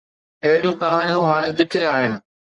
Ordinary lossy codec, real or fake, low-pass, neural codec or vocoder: Opus, 64 kbps; fake; 10.8 kHz; codec, 44.1 kHz, 1.7 kbps, Pupu-Codec